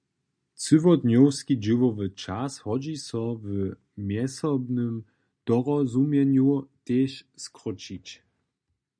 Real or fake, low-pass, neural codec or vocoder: real; 9.9 kHz; none